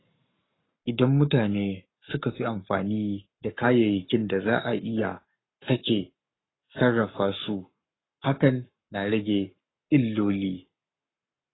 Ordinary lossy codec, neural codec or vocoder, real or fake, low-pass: AAC, 16 kbps; codec, 44.1 kHz, 7.8 kbps, Pupu-Codec; fake; 7.2 kHz